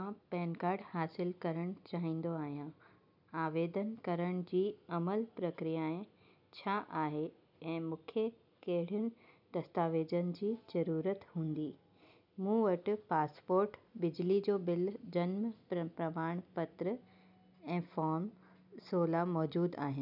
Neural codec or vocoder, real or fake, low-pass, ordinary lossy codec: none; real; 5.4 kHz; none